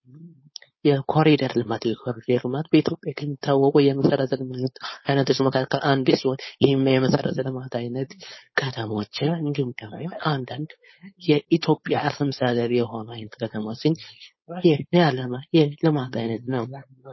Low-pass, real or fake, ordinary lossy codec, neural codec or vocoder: 7.2 kHz; fake; MP3, 24 kbps; codec, 16 kHz, 4.8 kbps, FACodec